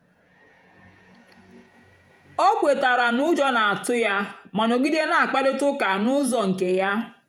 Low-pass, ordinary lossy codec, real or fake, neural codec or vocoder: 19.8 kHz; none; fake; vocoder, 48 kHz, 128 mel bands, Vocos